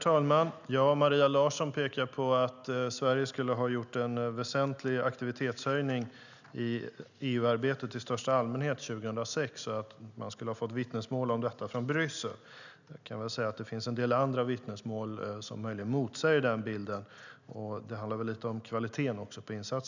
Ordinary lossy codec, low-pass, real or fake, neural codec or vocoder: none; 7.2 kHz; real; none